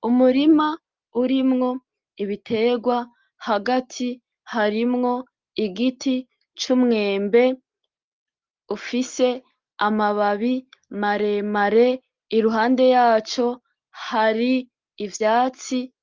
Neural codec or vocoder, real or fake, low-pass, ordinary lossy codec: none; real; 7.2 kHz; Opus, 32 kbps